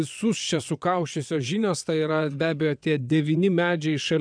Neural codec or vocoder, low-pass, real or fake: vocoder, 22.05 kHz, 80 mel bands, WaveNeXt; 9.9 kHz; fake